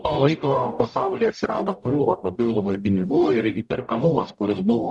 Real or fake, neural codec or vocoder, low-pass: fake; codec, 44.1 kHz, 0.9 kbps, DAC; 10.8 kHz